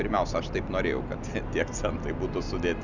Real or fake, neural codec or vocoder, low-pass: real; none; 7.2 kHz